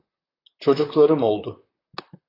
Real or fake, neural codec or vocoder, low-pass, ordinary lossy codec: fake; vocoder, 44.1 kHz, 128 mel bands, Pupu-Vocoder; 5.4 kHz; AAC, 24 kbps